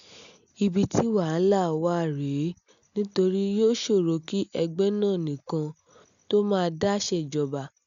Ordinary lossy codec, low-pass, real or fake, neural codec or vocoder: none; 7.2 kHz; real; none